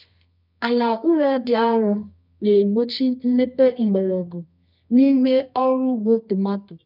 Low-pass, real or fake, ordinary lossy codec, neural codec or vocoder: 5.4 kHz; fake; none; codec, 24 kHz, 0.9 kbps, WavTokenizer, medium music audio release